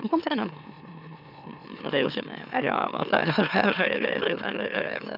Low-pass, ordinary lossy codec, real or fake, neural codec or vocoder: 5.4 kHz; none; fake; autoencoder, 44.1 kHz, a latent of 192 numbers a frame, MeloTTS